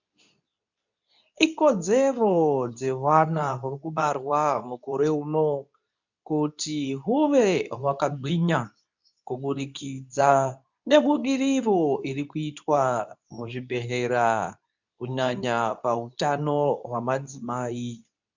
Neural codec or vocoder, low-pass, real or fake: codec, 24 kHz, 0.9 kbps, WavTokenizer, medium speech release version 2; 7.2 kHz; fake